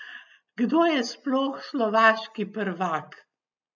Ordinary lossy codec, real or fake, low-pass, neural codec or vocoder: none; real; 7.2 kHz; none